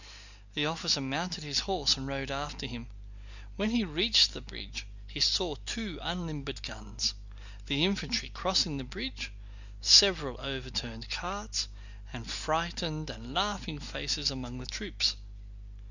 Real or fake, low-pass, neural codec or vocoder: real; 7.2 kHz; none